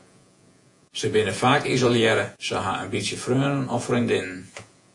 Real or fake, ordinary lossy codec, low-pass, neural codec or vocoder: fake; AAC, 48 kbps; 10.8 kHz; vocoder, 48 kHz, 128 mel bands, Vocos